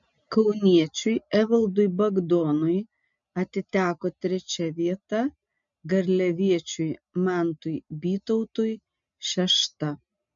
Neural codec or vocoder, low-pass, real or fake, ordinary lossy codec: none; 7.2 kHz; real; MP3, 64 kbps